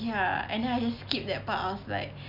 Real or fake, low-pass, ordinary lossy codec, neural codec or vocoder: real; 5.4 kHz; none; none